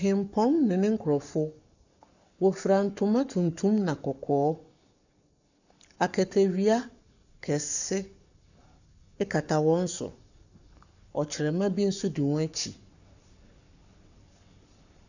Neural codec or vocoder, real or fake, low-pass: codec, 44.1 kHz, 7.8 kbps, Pupu-Codec; fake; 7.2 kHz